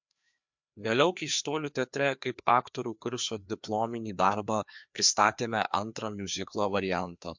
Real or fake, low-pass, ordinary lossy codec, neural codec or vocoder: fake; 7.2 kHz; MP3, 64 kbps; codec, 16 kHz, 2 kbps, FreqCodec, larger model